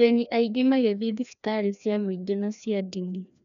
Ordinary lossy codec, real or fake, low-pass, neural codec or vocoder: none; fake; 7.2 kHz; codec, 16 kHz, 1 kbps, FreqCodec, larger model